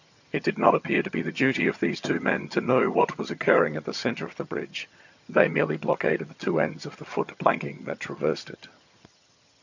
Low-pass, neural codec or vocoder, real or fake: 7.2 kHz; vocoder, 22.05 kHz, 80 mel bands, HiFi-GAN; fake